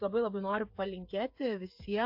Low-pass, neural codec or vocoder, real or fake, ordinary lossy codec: 5.4 kHz; codec, 16 kHz, 8 kbps, FreqCodec, smaller model; fake; AAC, 48 kbps